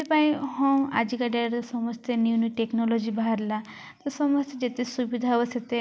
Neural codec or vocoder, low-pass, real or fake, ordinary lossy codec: none; none; real; none